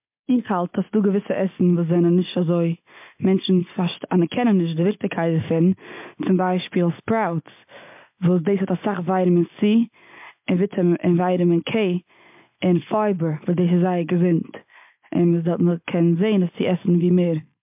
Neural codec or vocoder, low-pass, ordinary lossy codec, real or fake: none; 3.6 kHz; MP3, 32 kbps; real